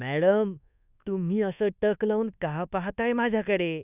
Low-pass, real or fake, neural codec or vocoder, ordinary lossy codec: 3.6 kHz; fake; codec, 24 kHz, 1.2 kbps, DualCodec; none